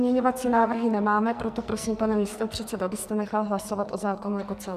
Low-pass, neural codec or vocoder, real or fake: 14.4 kHz; codec, 44.1 kHz, 2.6 kbps, SNAC; fake